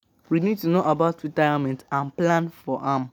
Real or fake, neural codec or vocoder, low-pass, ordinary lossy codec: real; none; 19.8 kHz; none